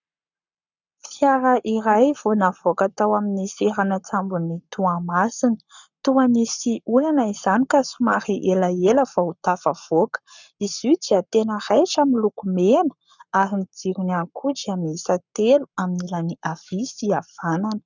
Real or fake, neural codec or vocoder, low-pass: fake; codec, 44.1 kHz, 7.8 kbps, Pupu-Codec; 7.2 kHz